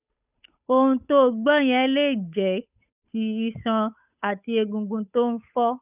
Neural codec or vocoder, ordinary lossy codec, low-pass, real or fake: codec, 16 kHz, 8 kbps, FunCodec, trained on Chinese and English, 25 frames a second; none; 3.6 kHz; fake